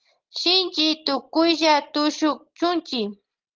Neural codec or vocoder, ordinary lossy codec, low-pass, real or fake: none; Opus, 16 kbps; 7.2 kHz; real